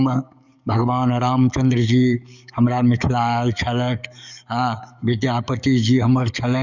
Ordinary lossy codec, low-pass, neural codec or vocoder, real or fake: none; 7.2 kHz; codec, 44.1 kHz, 7.8 kbps, DAC; fake